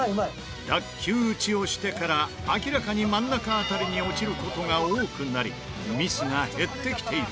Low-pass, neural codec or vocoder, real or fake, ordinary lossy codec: none; none; real; none